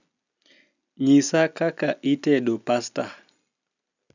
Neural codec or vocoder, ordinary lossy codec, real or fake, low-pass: none; none; real; 7.2 kHz